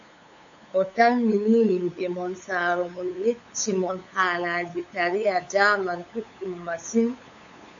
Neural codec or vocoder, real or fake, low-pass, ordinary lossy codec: codec, 16 kHz, 8 kbps, FunCodec, trained on LibriTTS, 25 frames a second; fake; 7.2 kHz; AAC, 48 kbps